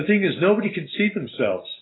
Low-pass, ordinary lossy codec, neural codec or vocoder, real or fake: 7.2 kHz; AAC, 16 kbps; none; real